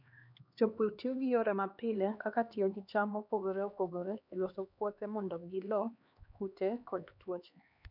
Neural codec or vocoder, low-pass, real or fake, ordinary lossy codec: codec, 16 kHz, 2 kbps, X-Codec, HuBERT features, trained on LibriSpeech; 5.4 kHz; fake; none